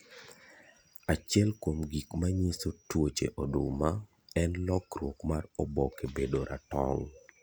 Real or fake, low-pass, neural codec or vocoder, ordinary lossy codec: real; none; none; none